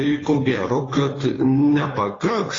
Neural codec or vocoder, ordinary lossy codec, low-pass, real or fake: codec, 16 kHz, 2 kbps, FunCodec, trained on Chinese and English, 25 frames a second; AAC, 32 kbps; 7.2 kHz; fake